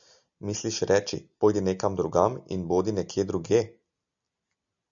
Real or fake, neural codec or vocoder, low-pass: real; none; 7.2 kHz